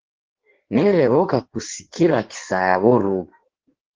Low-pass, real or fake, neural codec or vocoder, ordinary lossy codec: 7.2 kHz; fake; codec, 16 kHz in and 24 kHz out, 1.1 kbps, FireRedTTS-2 codec; Opus, 16 kbps